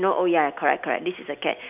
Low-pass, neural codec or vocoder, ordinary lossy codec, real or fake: 3.6 kHz; none; none; real